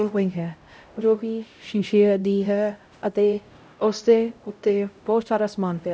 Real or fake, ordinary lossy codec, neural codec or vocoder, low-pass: fake; none; codec, 16 kHz, 0.5 kbps, X-Codec, HuBERT features, trained on LibriSpeech; none